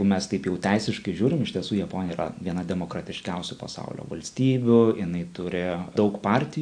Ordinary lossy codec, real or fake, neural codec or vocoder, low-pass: AAC, 64 kbps; real; none; 9.9 kHz